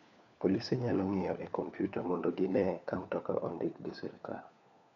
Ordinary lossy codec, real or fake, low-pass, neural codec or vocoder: none; fake; 7.2 kHz; codec, 16 kHz, 4 kbps, FunCodec, trained on LibriTTS, 50 frames a second